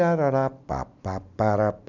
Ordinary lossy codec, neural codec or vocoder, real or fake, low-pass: none; none; real; 7.2 kHz